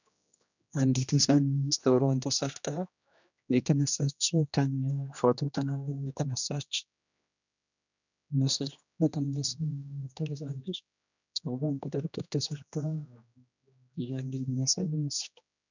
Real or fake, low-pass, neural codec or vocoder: fake; 7.2 kHz; codec, 16 kHz, 1 kbps, X-Codec, HuBERT features, trained on general audio